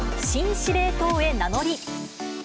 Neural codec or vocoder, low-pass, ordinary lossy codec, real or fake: none; none; none; real